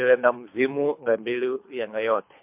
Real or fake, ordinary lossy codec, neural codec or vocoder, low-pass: fake; MP3, 32 kbps; codec, 24 kHz, 3 kbps, HILCodec; 3.6 kHz